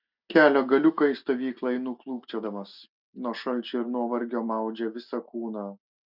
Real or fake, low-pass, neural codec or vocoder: real; 5.4 kHz; none